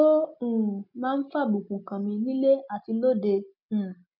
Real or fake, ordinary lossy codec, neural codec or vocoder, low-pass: real; none; none; 5.4 kHz